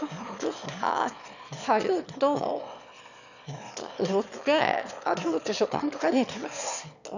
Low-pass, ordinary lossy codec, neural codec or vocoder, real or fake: 7.2 kHz; none; autoencoder, 22.05 kHz, a latent of 192 numbers a frame, VITS, trained on one speaker; fake